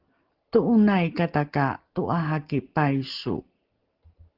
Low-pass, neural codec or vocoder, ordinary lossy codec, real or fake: 5.4 kHz; none; Opus, 16 kbps; real